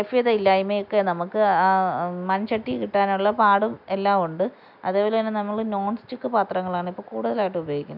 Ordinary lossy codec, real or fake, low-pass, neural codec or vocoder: none; real; 5.4 kHz; none